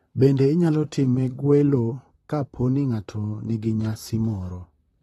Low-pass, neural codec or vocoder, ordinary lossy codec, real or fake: 19.8 kHz; vocoder, 44.1 kHz, 128 mel bands, Pupu-Vocoder; AAC, 32 kbps; fake